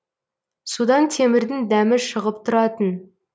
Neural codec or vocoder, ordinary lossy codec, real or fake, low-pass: none; none; real; none